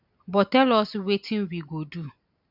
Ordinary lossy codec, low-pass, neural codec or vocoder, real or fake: none; 5.4 kHz; none; real